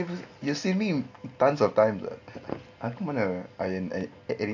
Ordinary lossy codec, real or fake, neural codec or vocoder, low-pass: AAC, 48 kbps; real; none; 7.2 kHz